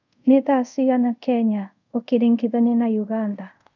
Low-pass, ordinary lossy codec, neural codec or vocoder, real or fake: 7.2 kHz; none; codec, 24 kHz, 0.5 kbps, DualCodec; fake